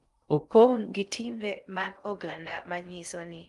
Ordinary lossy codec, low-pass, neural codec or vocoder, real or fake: Opus, 32 kbps; 10.8 kHz; codec, 16 kHz in and 24 kHz out, 0.6 kbps, FocalCodec, streaming, 2048 codes; fake